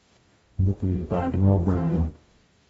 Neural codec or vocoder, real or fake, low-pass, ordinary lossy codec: codec, 44.1 kHz, 0.9 kbps, DAC; fake; 19.8 kHz; AAC, 24 kbps